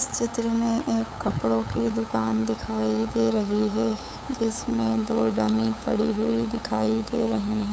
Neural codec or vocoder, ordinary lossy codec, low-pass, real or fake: codec, 16 kHz, 8 kbps, FunCodec, trained on LibriTTS, 25 frames a second; none; none; fake